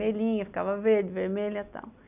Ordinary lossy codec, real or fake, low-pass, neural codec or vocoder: none; real; 3.6 kHz; none